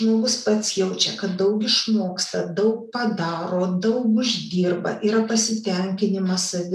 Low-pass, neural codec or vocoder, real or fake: 14.4 kHz; none; real